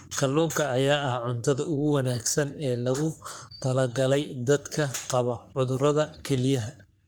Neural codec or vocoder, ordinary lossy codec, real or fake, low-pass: codec, 44.1 kHz, 3.4 kbps, Pupu-Codec; none; fake; none